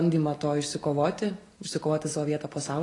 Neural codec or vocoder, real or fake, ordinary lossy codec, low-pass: none; real; AAC, 32 kbps; 10.8 kHz